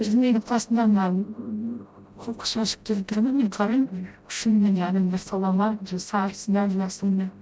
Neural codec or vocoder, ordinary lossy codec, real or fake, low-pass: codec, 16 kHz, 0.5 kbps, FreqCodec, smaller model; none; fake; none